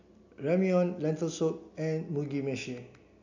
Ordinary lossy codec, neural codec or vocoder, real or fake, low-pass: none; none; real; 7.2 kHz